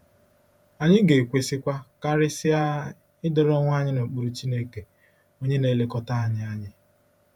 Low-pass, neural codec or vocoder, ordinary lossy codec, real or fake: 19.8 kHz; vocoder, 48 kHz, 128 mel bands, Vocos; none; fake